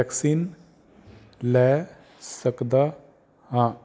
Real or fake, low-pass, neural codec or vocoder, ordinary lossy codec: real; none; none; none